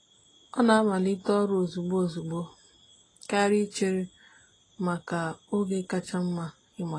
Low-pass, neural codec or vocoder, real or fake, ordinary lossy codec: 9.9 kHz; none; real; AAC, 32 kbps